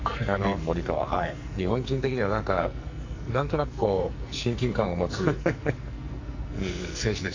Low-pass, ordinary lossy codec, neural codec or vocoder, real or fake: 7.2 kHz; AAC, 48 kbps; codec, 44.1 kHz, 2.6 kbps, SNAC; fake